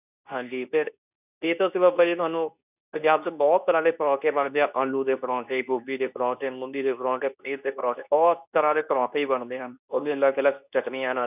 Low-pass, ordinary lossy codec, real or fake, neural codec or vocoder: 3.6 kHz; none; fake; codec, 24 kHz, 0.9 kbps, WavTokenizer, medium speech release version 2